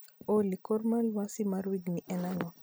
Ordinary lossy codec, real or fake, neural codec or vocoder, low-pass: none; real; none; none